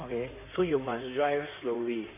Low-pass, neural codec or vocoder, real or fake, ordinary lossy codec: 3.6 kHz; codec, 16 kHz in and 24 kHz out, 2.2 kbps, FireRedTTS-2 codec; fake; none